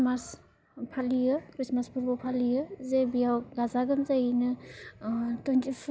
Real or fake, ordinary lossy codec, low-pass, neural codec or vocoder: real; none; none; none